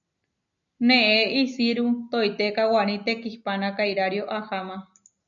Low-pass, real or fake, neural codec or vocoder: 7.2 kHz; real; none